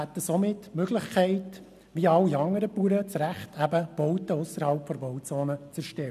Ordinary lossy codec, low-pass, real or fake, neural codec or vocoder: none; 14.4 kHz; real; none